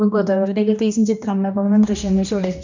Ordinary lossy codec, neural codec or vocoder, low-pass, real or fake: none; codec, 16 kHz, 1 kbps, X-Codec, HuBERT features, trained on general audio; 7.2 kHz; fake